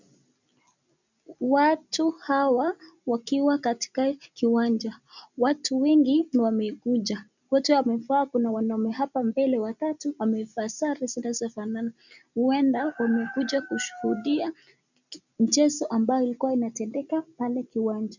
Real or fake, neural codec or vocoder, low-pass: real; none; 7.2 kHz